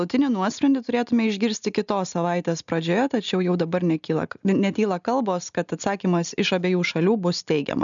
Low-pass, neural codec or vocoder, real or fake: 7.2 kHz; none; real